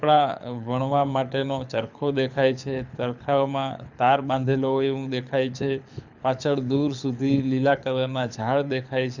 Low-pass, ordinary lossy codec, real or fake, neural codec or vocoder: 7.2 kHz; none; fake; codec, 24 kHz, 6 kbps, HILCodec